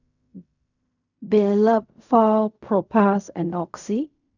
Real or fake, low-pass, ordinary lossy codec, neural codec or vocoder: fake; 7.2 kHz; none; codec, 16 kHz in and 24 kHz out, 0.4 kbps, LongCat-Audio-Codec, fine tuned four codebook decoder